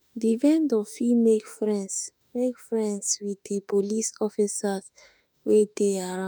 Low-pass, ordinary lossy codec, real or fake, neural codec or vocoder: none; none; fake; autoencoder, 48 kHz, 32 numbers a frame, DAC-VAE, trained on Japanese speech